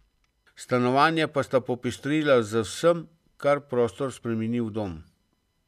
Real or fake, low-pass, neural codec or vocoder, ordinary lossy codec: real; 14.4 kHz; none; none